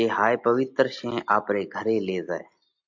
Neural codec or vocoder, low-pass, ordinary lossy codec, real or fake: none; 7.2 kHz; MP3, 48 kbps; real